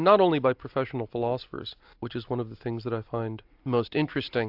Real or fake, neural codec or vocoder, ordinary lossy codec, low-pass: real; none; AAC, 48 kbps; 5.4 kHz